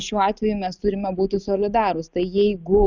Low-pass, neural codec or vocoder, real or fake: 7.2 kHz; none; real